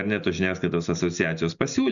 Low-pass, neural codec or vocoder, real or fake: 7.2 kHz; none; real